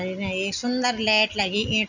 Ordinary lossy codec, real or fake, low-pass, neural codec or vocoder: none; real; 7.2 kHz; none